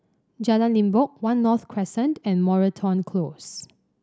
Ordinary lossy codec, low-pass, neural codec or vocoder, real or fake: none; none; none; real